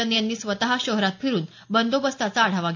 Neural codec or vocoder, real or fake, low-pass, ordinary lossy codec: none; real; 7.2 kHz; AAC, 48 kbps